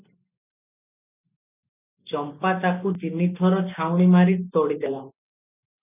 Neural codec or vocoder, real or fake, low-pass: none; real; 3.6 kHz